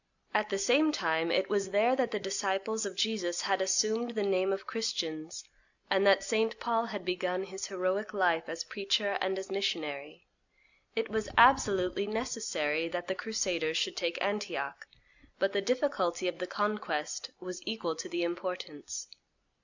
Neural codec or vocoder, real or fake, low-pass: none; real; 7.2 kHz